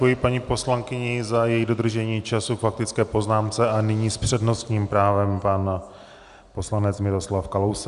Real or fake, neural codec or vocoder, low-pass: real; none; 10.8 kHz